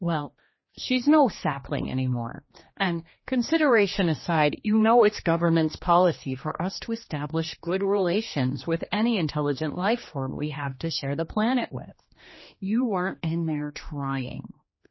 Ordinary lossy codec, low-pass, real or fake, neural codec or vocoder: MP3, 24 kbps; 7.2 kHz; fake; codec, 16 kHz, 2 kbps, X-Codec, HuBERT features, trained on general audio